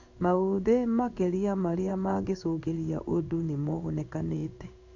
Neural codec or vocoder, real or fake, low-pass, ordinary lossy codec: codec, 16 kHz in and 24 kHz out, 1 kbps, XY-Tokenizer; fake; 7.2 kHz; none